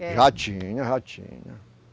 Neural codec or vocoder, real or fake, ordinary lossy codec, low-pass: none; real; none; none